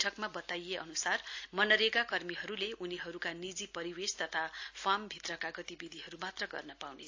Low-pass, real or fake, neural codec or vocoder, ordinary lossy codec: 7.2 kHz; real; none; AAC, 48 kbps